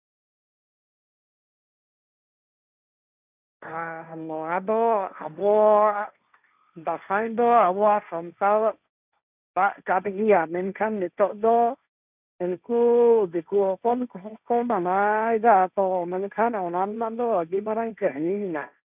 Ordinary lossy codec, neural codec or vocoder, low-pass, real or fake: none; codec, 16 kHz, 1.1 kbps, Voila-Tokenizer; 3.6 kHz; fake